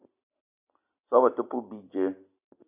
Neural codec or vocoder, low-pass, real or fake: none; 3.6 kHz; real